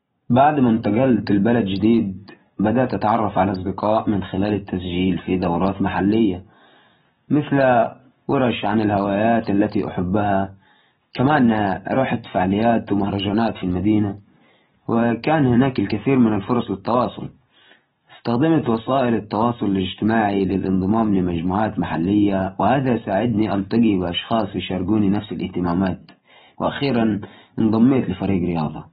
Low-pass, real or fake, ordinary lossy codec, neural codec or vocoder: 7.2 kHz; real; AAC, 16 kbps; none